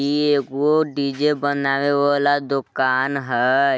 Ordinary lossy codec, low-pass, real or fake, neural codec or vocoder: none; none; real; none